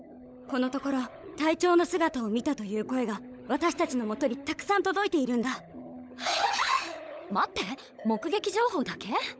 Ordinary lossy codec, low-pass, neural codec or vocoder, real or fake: none; none; codec, 16 kHz, 16 kbps, FunCodec, trained on LibriTTS, 50 frames a second; fake